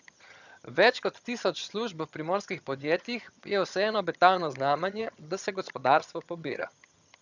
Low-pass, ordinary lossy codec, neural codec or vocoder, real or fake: 7.2 kHz; none; vocoder, 22.05 kHz, 80 mel bands, HiFi-GAN; fake